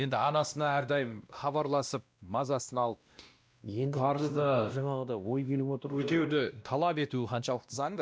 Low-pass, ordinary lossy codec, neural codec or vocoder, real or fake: none; none; codec, 16 kHz, 1 kbps, X-Codec, WavLM features, trained on Multilingual LibriSpeech; fake